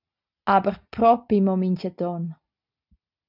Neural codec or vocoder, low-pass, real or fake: none; 5.4 kHz; real